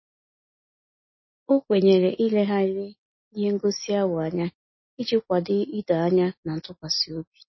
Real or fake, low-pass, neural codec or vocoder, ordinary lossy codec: real; 7.2 kHz; none; MP3, 24 kbps